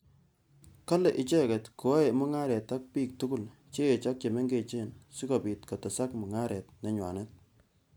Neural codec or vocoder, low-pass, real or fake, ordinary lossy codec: none; none; real; none